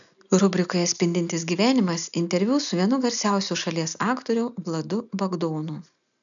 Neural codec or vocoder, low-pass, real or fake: none; 7.2 kHz; real